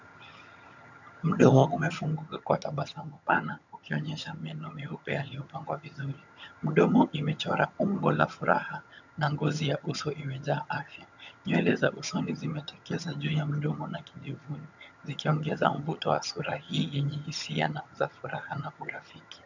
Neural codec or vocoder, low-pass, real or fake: vocoder, 22.05 kHz, 80 mel bands, HiFi-GAN; 7.2 kHz; fake